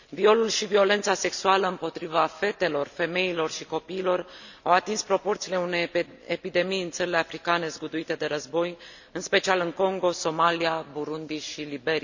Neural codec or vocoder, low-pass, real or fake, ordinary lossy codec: none; 7.2 kHz; real; none